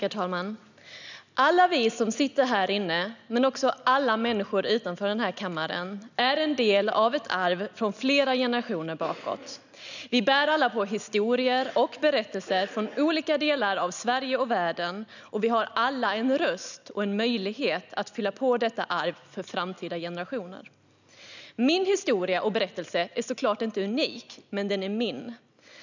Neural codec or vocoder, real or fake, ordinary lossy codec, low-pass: none; real; none; 7.2 kHz